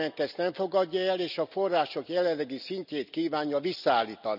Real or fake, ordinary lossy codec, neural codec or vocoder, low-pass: real; none; none; 5.4 kHz